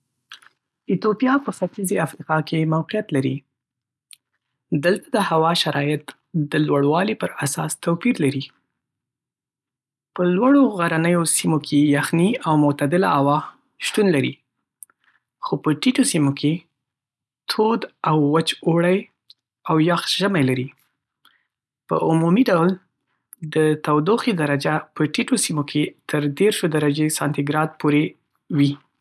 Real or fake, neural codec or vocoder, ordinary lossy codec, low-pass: real; none; none; none